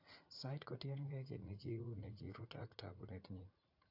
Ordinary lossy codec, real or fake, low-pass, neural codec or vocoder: AAC, 48 kbps; fake; 5.4 kHz; vocoder, 44.1 kHz, 80 mel bands, Vocos